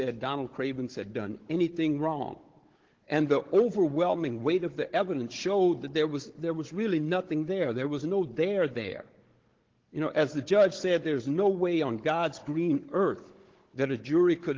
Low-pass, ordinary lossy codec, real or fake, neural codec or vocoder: 7.2 kHz; Opus, 16 kbps; fake; codec, 16 kHz, 16 kbps, FunCodec, trained on Chinese and English, 50 frames a second